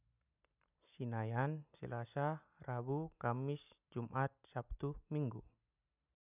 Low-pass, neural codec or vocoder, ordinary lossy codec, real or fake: 3.6 kHz; none; none; real